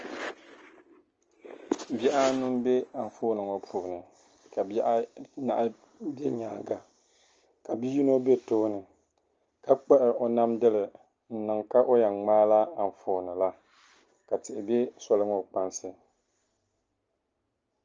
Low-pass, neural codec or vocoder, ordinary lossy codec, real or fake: 7.2 kHz; none; Opus, 24 kbps; real